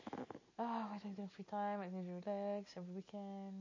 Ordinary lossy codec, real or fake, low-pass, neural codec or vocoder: MP3, 32 kbps; real; 7.2 kHz; none